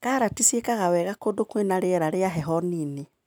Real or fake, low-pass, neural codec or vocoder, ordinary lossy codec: real; none; none; none